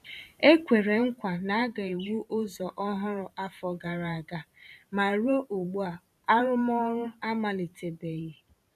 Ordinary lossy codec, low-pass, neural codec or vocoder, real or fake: none; 14.4 kHz; vocoder, 44.1 kHz, 128 mel bands every 512 samples, BigVGAN v2; fake